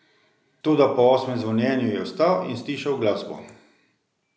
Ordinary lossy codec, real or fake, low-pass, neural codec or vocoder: none; real; none; none